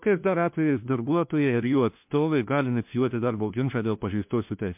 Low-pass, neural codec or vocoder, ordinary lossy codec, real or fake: 3.6 kHz; codec, 16 kHz, 1 kbps, FunCodec, trained on LibriTTS, 50 frames a second; MP3, 32 kbps; fake